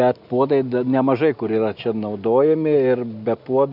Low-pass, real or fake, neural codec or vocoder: 5.4 kHz; real; none